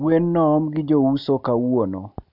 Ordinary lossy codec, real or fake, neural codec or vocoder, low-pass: none; real; none; 5.4 kHz